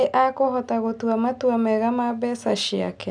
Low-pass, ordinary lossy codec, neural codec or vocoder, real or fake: 9.9 kHz; none; none; real